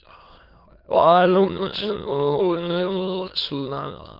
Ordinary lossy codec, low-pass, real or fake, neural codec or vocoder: Opus, 32 kbps; 5.4 kHz; fake; autoencoder, 22.05 kHz, a latent of 192 numbers a frame, VITS, trained on many speakers